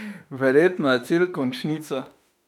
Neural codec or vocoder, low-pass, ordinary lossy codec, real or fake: autoencoder, 48 kHz, 32 numbers a frame, DAC-VAE, trained on Japanese speech; 19.8 kHz; none; fake